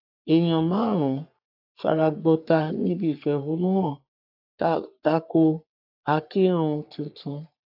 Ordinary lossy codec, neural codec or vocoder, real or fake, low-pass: AAC, 48 kbps; codec, 44.1 kHz, 3.4 kbps, Pupu-Codec; fake; 5.4 kHz